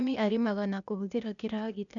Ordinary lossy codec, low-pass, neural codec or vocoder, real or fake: none; 7.2 kHz; codec, 16 kHz, 0.8 kbps, ZipCodec; fake